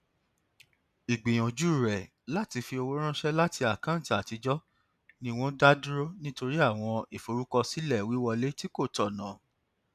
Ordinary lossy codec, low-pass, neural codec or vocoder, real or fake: none; 14.4 kHz; none; real